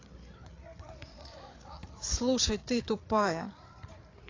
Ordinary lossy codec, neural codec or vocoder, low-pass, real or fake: AAC, 32 kbps; codec, 16 kHz, 8 kbps, FreqCodec, larger model; 7.2 kHz; fake